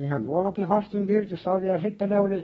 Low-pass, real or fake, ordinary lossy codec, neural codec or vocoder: 14.4 kHz; fake; AAC, 24 kbps; codec, 32 kHz, 1.9 kbps, SNAC